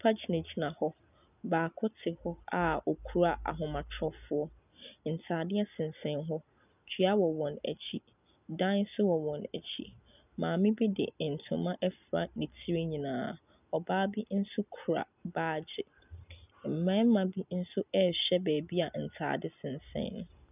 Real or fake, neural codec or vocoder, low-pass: real; none; 3.6 kHz